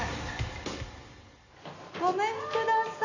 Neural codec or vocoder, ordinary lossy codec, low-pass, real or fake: none; none; 7.2 kHz; real